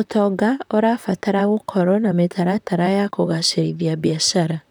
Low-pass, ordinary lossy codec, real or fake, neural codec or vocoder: none; none; fake; vocoder, 44.1 kHz, 128 mel bands, Pupu-Vocoder